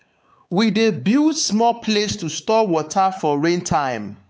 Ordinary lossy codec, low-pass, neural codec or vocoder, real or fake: none; none; codec, 16 kHz, 4 kbps, X-Codec, WavLM features, trained on Multilingual LibriSpeech; fake